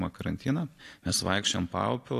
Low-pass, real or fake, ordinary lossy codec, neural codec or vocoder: 14.4 kHz; real; AAC, 48 kbps; none